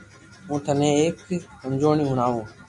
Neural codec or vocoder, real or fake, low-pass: none; real; 10.8 kHz